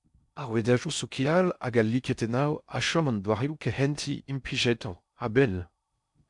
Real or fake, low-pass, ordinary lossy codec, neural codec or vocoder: fake; 10.8 kHz; MP3, 96 kbps; codec, 16 kHz in and 24 kHz out, 0.6 kbps, FocalCodec, streaming, 4096 codes